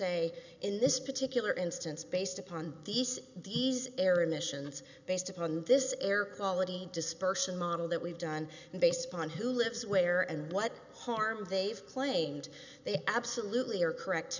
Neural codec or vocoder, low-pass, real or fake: none; 7.2 kHz; real